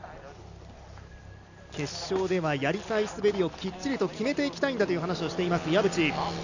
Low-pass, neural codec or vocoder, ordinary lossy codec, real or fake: 7.2 kHz; none; none; real